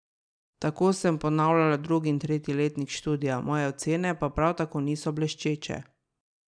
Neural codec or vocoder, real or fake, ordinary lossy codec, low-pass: none; real; none; 9.9 kHz